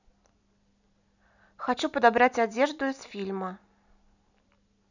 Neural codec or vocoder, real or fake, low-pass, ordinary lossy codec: none; real; 7.2 kHz; none